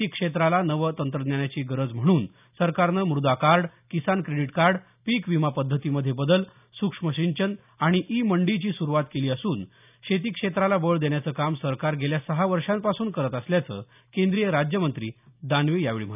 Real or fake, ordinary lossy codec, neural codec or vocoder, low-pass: real; none; none; 3.6 kHz